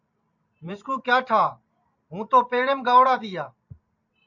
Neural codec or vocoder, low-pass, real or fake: vocoder, 44.1 kHz, 128 mel bands every 512 samples, BigVGAN v2; 7.2 kHz; fake